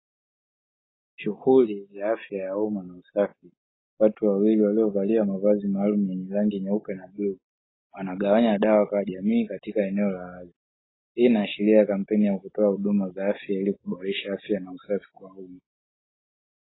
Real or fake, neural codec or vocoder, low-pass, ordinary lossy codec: real; none; 7.2 kHz; AAC, 16 kbps